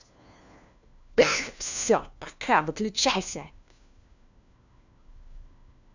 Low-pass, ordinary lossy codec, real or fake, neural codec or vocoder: 7.2 kHz; none; fake; codec, 16 kHz, 1 kbps, FunCodec, trained on LibriTTS, 50 frames a second